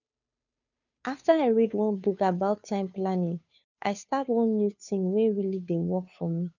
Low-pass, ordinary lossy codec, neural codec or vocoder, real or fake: 7.2 kHz; none; codec, 16 kHz, 2 kbps, FunCodec, trained on Chinese and English, 25 frames a second; fake